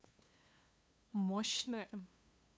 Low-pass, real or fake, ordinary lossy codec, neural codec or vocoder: none; fake; none; codec, 16 kHz, 2 kbps, FunCodec, trained on LibriTTS, 25 frames a second